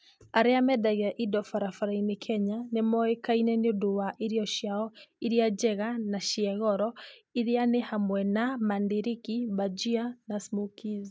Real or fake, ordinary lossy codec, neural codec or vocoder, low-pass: real; none; none; none